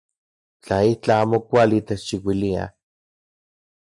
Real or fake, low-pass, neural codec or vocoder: real; 10.8 kHz; none